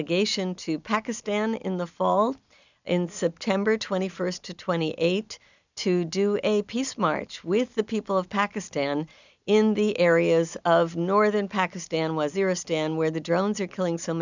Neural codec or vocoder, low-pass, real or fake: none; 7.2 kHz; real